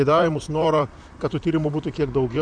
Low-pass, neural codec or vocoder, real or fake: 9.9 kHz; vocoder, 44.1 kHz, 128 mel bands, Pupu-Vocoder; fake